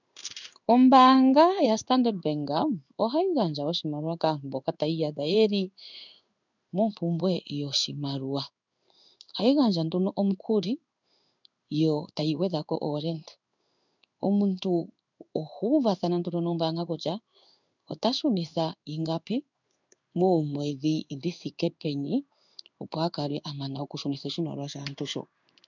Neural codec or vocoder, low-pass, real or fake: codec, 16 kHz in and 24 kHz out, 1 kbps, XY-Tokenizer; 7.2 kHz; fake